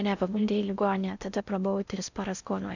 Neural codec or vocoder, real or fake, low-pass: codec, 16 kHz in and 24 kHz out, 0.6 kbps, FocalCodec, streaming, 4096 codes; fake; 7.2 kHz